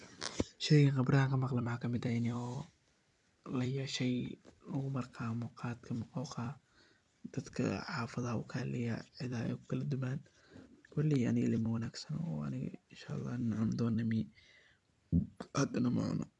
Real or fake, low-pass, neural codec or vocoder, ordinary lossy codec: fake; 10.8 kHz; vocoder, 44.1 kHz, 128 mel bands every 256 samples, BigVGAN v2; none